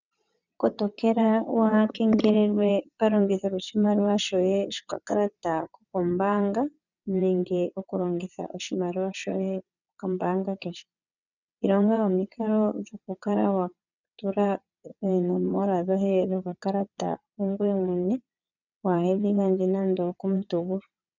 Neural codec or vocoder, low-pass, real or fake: vocoder, 22.05 kHz, 80 mel bands, WaveNeXt; 7.2 kHz; fake